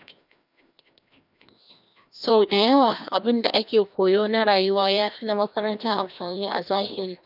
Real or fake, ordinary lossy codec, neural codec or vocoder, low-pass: fake; none; codec, 16 kHz, 1 kbps, FreqCodec, larger model; 5.4 kHz